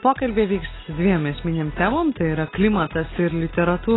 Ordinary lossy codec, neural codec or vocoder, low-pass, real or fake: AAC, 16 kbps; none; 7.2 kHz; real